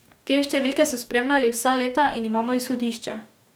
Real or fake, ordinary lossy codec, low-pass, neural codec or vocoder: fake; none; none; codec, 44.1 kHz, 2.6 kbps, DAC